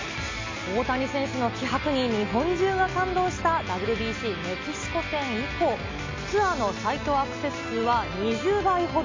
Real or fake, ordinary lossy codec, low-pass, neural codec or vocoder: real; MP3, 64 kbps; 7.2 kHz; none